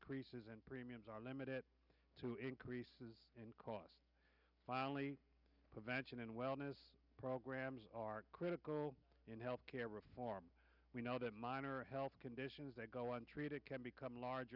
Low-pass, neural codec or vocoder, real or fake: 5.4 kHz; none; real